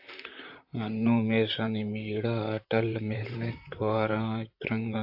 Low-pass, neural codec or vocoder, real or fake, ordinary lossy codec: 5.4 kHz; vocoder, 44.1 kHz, 128 mel bands, Pupu-Vocoder; fake; AAC, 48 kbps